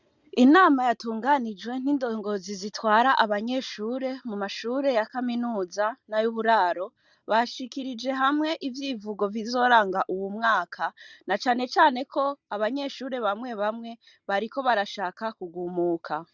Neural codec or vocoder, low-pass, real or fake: none; 7.2 kHz; real